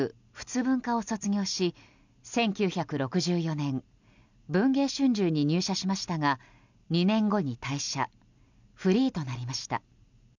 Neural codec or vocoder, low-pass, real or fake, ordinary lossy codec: none; 7.2 kHz; real; none